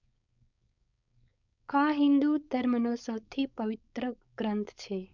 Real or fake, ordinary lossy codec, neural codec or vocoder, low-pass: fake; none; codec, 16 kHz, 4.8 kbps, FACodec; 7.2 kHz